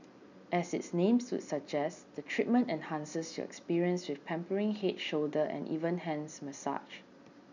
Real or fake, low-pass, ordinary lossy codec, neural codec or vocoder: real; 7.2 kHz; none; none